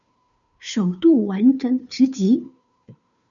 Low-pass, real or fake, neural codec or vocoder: 7.2 kHz; fake; codec, 16 kHz, 2 kbps, FunCodec, trained on Chinese and English, 25 frames a second